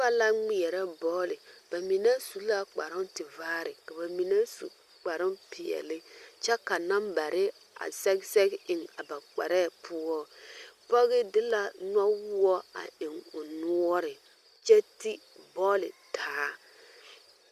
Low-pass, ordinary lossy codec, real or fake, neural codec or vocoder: 14.4 kHz; Opus, 64 kbps; real; none